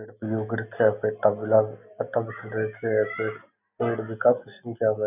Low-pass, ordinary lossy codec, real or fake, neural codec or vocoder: 3.6 kHz; none; real; none